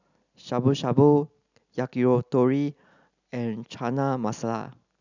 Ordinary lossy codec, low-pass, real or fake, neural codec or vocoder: none; 7.2 kHz; real; none